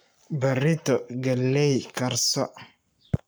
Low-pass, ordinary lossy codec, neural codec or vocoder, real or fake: none; none; codec, 44.1 kHz, 7.8 kbps, Pupu-Codec; fake